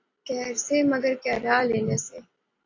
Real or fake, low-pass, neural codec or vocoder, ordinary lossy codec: real; 7.2 kHz; none; AAC, 32 kbps